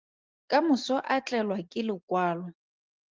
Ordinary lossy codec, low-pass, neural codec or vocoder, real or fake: Opus, 32 kbps; 7.2 kHz; none; real